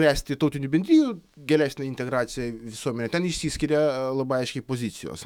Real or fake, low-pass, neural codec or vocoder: fake; 19.8 kHz; autoencoder, 48 kHz, 128 numbers a frame, DAC-VAE, trained on Japanese speech